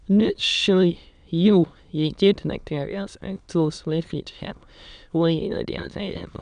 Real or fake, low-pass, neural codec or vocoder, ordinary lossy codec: fake; 9.9 kHz; autoencoder, 22.05 kHz, a latent of 192 numbers a frame, VITS, trained on many speakers; none